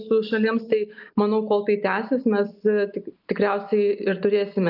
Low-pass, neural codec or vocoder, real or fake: 5.4 kHz; none; real